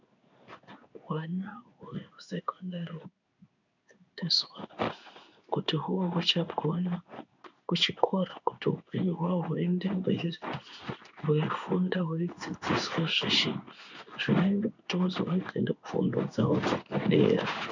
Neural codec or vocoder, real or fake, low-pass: codec, 16 kHz in and 24 kHz out, 1 kbps, XY-Tokenizer; fake; 7.2 kHz